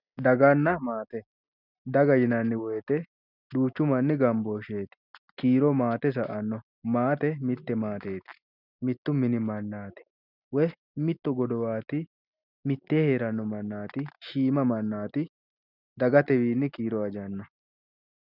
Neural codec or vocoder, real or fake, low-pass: none; real; 5.4 kHz